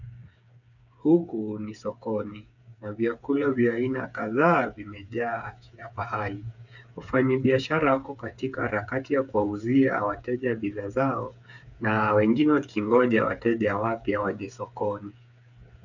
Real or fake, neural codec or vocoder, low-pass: fake; codec, 16 kHz, 8 kbps, FreqCodec, smaller model; 7.2 kHz